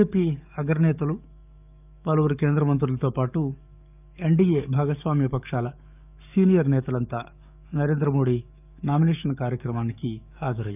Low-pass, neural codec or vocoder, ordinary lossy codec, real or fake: 3.6 kHz; codec, 44.1 kHz, 7.8 kbps, DAC; none; fake